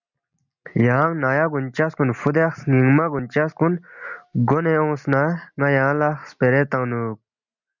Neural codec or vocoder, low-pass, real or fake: none; 7.2 kHz; real